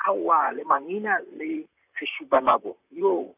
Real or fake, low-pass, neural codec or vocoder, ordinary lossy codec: fake; 3.6 kHz; vocoder, 44.1 kHz, 128 mel bands, Pupu-Vocoder; AAC, 32 kbps